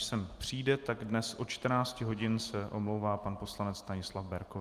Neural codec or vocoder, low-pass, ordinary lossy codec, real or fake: none; 14.4 kHz; Opus, 32 kbps; real